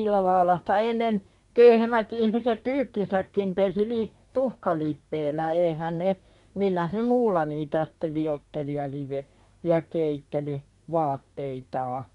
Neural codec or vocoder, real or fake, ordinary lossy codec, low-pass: codec, 24 kHz, 1 kbps, SNAC; fake; none; 10.8 kHz